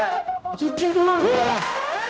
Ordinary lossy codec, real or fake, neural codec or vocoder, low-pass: none; fake; codec, 16 kHz, 0.5 kbps, X-Codec, HuBERT features, trained on general audio; none